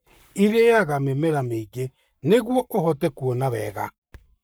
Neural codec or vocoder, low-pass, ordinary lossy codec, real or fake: codec, 44.1 kHz, 7.8 kbps, Pupu-Codec; none; none; fake